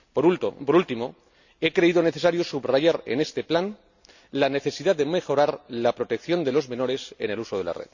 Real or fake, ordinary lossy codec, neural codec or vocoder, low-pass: real; none; none; 7.2 kHz